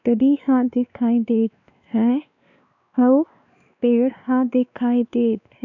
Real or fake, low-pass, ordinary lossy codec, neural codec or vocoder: fake; 7.2 kHz; none; codec, 16 kHz, 2 kbps, X-Codec, WavLM features, trained on Multilingual LibriSpeech